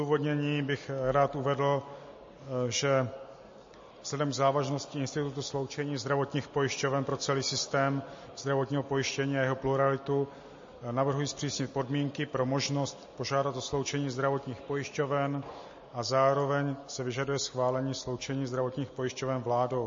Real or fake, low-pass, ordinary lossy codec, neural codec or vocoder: real; 7.2 kHz; MP3, 32 kbps; none